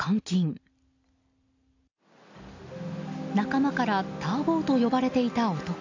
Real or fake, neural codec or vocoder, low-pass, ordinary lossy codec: real; none; 7.2 kHz; AAC, 48 kbps